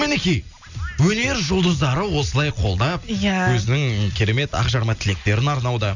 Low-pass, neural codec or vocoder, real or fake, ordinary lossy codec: 7.2 kHz; none; real; none